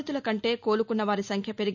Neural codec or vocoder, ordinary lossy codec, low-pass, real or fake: none; none; 7.2 kHz; real